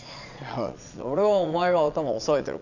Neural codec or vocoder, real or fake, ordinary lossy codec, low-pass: vocoder, 22.05 kHz, 80 mel bands, Vocos; fake; none; 7.2 kHz